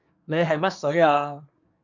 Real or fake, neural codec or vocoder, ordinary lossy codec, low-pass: fake; codec, 24 kHz, 1 kbps, SNAC; MP3, 64 kbps; 7.2 kHz